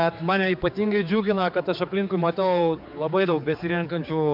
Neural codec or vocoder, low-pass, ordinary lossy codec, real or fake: codec, 16 kHz, 4 kbps, X-Codec, HuBERT features, trained on general audio; 5.4 kHz; MP3, 48 kbps; fake